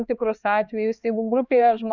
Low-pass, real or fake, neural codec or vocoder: 7.2 kHz; fake; codec, 16 kHz, 2 kbps, X-Codec, HuBERT features, trained on LibriSpeech